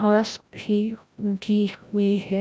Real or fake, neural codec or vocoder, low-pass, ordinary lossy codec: fake; codec, 16 kHz, 0.5 kbps, FreqCodec, larger model; none; none